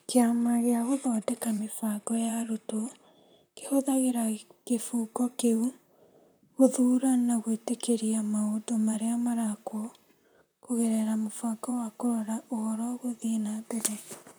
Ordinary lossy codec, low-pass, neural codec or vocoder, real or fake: none; none; vocoder, 44.1 kHz, 128 mel bands, Pupu-Vocoder; fake